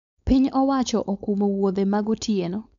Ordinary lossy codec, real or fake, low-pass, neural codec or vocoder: none; fake; 7.2 kHz; codec, 16 kHz, 4.8 kbps, FACodec